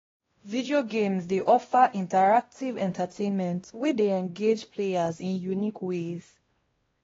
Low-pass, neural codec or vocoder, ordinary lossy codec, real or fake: 7.2 kHz; codec, 16 kHz, 1 kbps, X-Codec, WavLM features, trained on Multilingual LibriSpeech; AAC, 24 kbps; fake